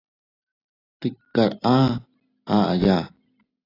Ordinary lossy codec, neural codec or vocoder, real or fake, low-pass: AAC, 24 kbps; none; real; 5.4 kHz